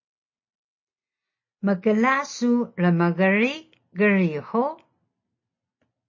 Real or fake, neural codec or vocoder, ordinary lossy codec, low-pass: real; none; MP3, 32 kbps; 7.2 kHz